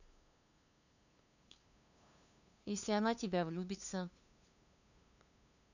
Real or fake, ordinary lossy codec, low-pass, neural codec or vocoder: fake; none; 7.2 kHz; codec, 16 kHz, 2 kbps, FunCodec, trained on LibriTTS, 25 frames a second